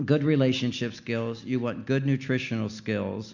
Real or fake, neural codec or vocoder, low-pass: real; none; 7.2 kHz